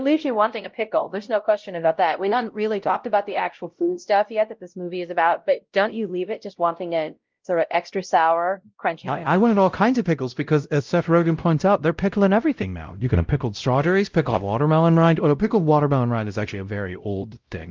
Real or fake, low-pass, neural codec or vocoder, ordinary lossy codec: fake; 7.2 kHz; codec, 16 kHz, 0.5 kbps, X-Codec, WavLM features, trained on Multilingual LibriSpeech; Opus, 32 kbps